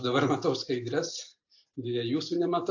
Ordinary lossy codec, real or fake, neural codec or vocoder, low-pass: AAC, 48 kbps; real; none; 7.2 kHz